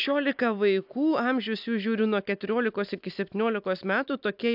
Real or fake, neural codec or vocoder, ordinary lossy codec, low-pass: real; none; AAC, 48 kbps; 5.4 kHz